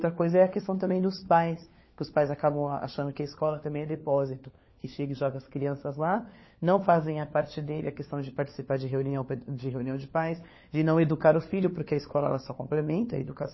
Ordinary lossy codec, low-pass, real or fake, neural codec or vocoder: MP3, 24 kbps; 7.2 kHz; fake; codec, 16 kHz, 4 kbps, FunCodec, trained on LibriTTS, 50 frames a second